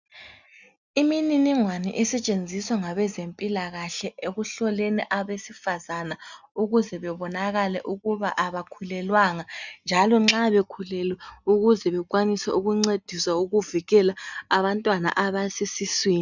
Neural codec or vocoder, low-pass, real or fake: none; 7.2 kHz; real